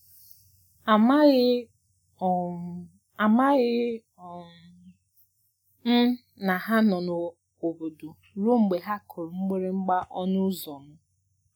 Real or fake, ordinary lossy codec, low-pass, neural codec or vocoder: real; none; 19.8 kHz; none